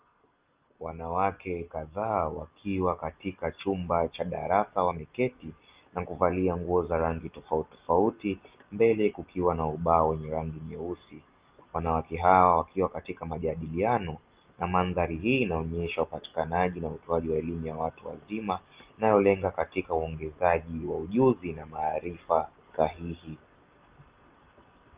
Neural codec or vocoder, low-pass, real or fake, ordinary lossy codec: none; 3.6 kHz; real; Opus, 32 kbps